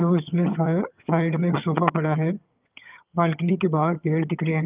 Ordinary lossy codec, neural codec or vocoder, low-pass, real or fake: Opus, 24 kbps; vocoder, 22.05 kHz, 80 mel bands, HiFi-GAN; 3.6 kHz; fake